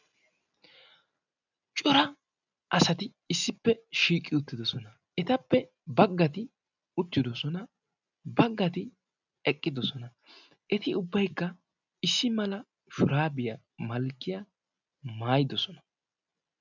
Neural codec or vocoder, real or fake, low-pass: none; real; 7.2 kHz